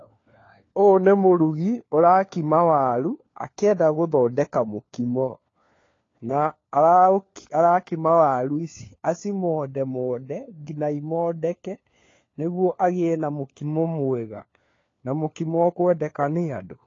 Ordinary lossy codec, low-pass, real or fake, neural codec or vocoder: AAC, 32 kbps; 7.2 kHz; fake; codec, 16 kHz, 4 kbps, FunCodec, trained on LibriTTS, 50 frames a second